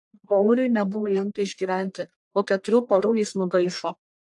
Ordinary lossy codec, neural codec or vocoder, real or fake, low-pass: AAC, 64 kbps; codec, 44.1 kHz, 1.7 kbps, Pupu-Codec; fake; 10.8 kHz